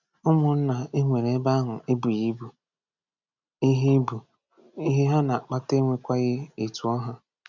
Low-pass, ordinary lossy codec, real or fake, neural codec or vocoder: 7.2 kHz; none; real; none